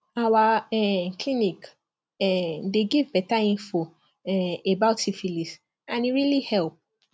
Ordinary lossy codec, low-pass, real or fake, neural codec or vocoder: none; none; real; none